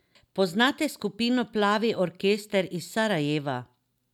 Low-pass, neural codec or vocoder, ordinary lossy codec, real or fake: 19.8 kHz; none; none; real